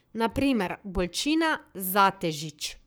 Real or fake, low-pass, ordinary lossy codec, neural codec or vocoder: fake; none; none; codec, 44.1 kHz, 7.8 kbps, Pupu-Codec